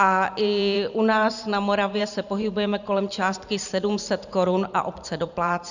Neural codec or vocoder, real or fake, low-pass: vocoder, 44.1 kHz, 128 mel bands every 512 samples, BigVGAN v2; fake; 7.2 kHz